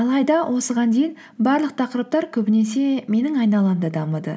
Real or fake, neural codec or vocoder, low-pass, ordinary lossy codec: real; none; none; none